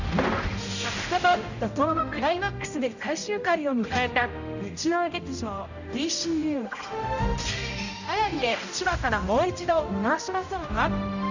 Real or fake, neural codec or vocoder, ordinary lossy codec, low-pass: fake; codec, 16 kHz, 0.5 kbps, X-Codec, HuBERT features, trained on general audio; none; 7.2 kHz